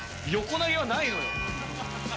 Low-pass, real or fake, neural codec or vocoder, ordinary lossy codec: none; real; none; none